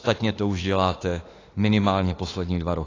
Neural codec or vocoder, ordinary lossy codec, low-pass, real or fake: autoencoder, 48 kHz, 32 numbers a frame, DAC-VAE, trained on Japanese speech; AAC, 32 kbps; 7.2 kHz; fake